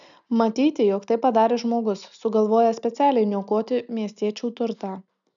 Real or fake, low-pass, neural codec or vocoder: real; 7.2 kHz; none